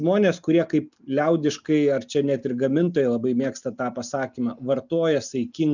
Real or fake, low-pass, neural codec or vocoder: real; 7.2 kHz; none